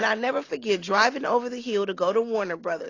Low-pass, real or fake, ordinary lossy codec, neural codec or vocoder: 7.2 kHz; real; AAC, 32 kbps; none